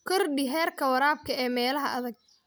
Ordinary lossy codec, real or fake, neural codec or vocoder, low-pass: none; real; none; none